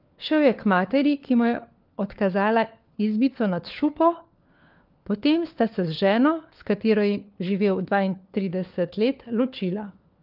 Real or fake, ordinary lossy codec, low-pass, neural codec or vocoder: fake; Opus, 24 kbps; 5.4 kHz; codec, 16 kHz, 4 kbps, FunCodec, trained on LibriTTS, 50 frames a second